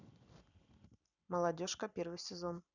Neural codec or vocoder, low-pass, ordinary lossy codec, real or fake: vocoder, 44.1 kHz, 128 mel bands every 256 samples, BigVGAN v2; 7.2 kHz; none; fake